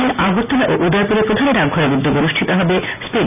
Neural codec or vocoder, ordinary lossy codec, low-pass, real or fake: none; none; 3.6 kHz; real